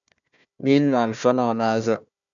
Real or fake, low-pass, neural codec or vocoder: fake; 7.2 kHz; codec, 16 kHz, 1 kbps, FunCodec, trained on Chinese and English, 50 frames a second